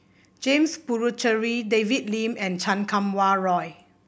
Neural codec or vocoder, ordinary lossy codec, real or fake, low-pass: none; none; real; none